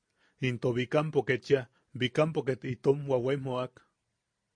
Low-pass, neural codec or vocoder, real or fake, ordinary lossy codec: 9.9 kHz; none; real; MP3, 48 kbps